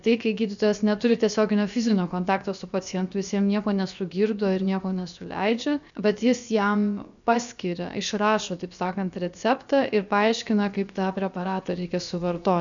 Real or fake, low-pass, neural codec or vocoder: fake; 7.2 kHz; codec, 16 kHz, about 1 kbps, DyCAST, with the encoder's durations